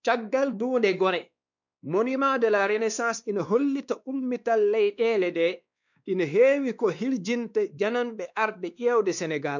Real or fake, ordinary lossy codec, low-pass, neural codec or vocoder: fake; none; 7.2 kHz; codec, 16 kHz, 2 kbps, X-Codec, WavLM features, trained on Multilingual LibriSpeech